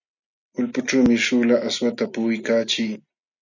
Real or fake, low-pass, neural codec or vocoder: real; 7.2 kHz; none